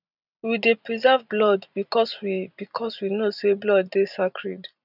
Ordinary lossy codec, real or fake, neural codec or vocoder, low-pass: none; fake; vocoder, 44.1 kHz, 128 mel bands every 256 samples, BigVGAN v2; 5.4 kHz